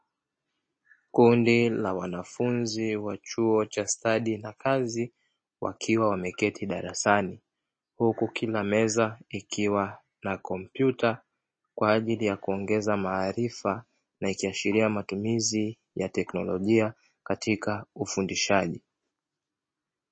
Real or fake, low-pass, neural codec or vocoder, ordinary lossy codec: real; 9.9 kHz; none; MP3, 32 kbps